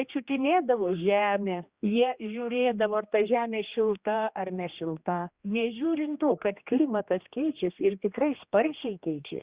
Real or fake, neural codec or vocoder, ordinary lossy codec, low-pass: fake; codec, 16 kHz, 2 kbps, X-Codec, HuBERT features, trained on general audio; Opus, 64 kbps; 3.6 kHz